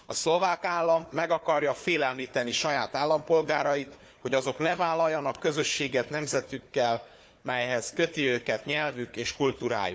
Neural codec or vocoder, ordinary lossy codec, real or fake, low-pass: codec, 16 kHz, 4 kbps, FunCodec, trained on Chinese and English, 50 frames a second; none; fake; none